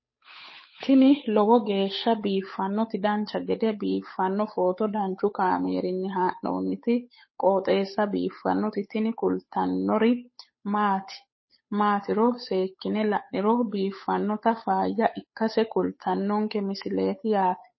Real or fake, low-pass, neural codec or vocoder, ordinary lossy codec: fake; 7.2 kHz; codec, 16 kHz, 8 kbps, FunCodec, trained on Chinese and English, 25 frames a second; MP3, 24 kbps